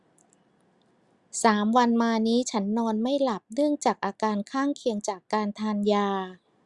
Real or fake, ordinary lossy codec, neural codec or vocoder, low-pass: real; Opus, 64 kbps; none; 10.8 kHz